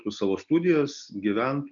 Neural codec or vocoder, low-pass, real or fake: none; 7.2 kHz; real